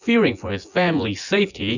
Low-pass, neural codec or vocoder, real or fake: 7.2 kHz; vocoder, 24 kHz, 100 mel bands, Vocos; fake